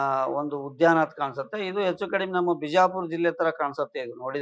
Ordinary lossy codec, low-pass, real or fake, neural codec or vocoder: none; none; real; none